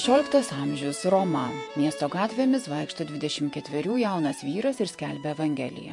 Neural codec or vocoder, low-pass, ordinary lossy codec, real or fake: vocoder, 48 kHz, 128 mel bands, Vocos; 10.8 kHz; MP3, 64 kbps; fake